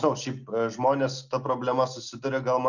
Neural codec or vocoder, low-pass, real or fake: none; 7.2 kHz; real